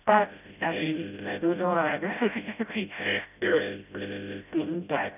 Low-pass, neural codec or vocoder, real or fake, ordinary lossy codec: 3.6 kHz; codec, 16 kHz, 0.5 kbps, FreqCodec, smaller model; fake; none